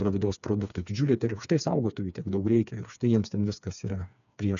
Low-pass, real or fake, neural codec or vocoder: 7.2 kHz; fake; codec, 16 kHz, 4 kbps, FreqCodec, smaller model